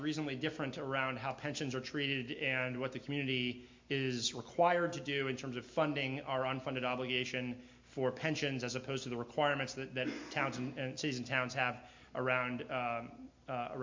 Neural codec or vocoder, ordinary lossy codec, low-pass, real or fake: none; MP3, 48 kbps; 7.2 kHz; real